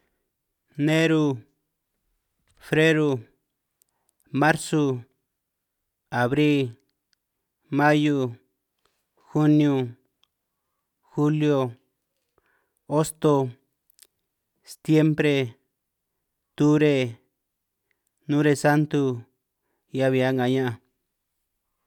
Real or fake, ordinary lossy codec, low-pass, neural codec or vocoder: real; none; 19.8 kHz; none